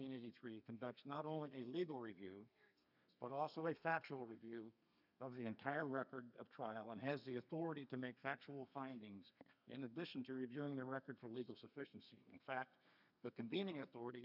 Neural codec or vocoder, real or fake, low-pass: codec, 32 kHz, 1.9 kbps, SNAC; fake; 5.4 kHz